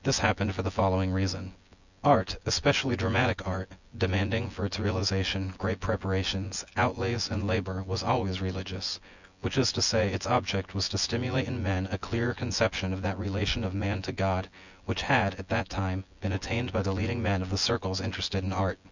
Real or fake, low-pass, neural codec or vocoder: fake; 7.2 kHz; vocoder, 24 kHz, 100 mel bands, Vocos